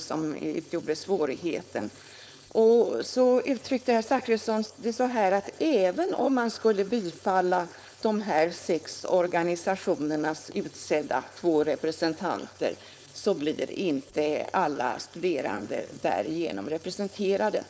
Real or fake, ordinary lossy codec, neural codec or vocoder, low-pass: fake; none; codec, 16 kHz, 4.8 kbps, FACodec; none